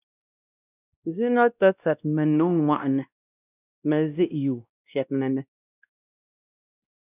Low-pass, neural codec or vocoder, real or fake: 3.6 kHz; codec, 16 kHz, 1 kbps, X-Codec, WavLM features, trained on Multilingual LibriSpeech; fake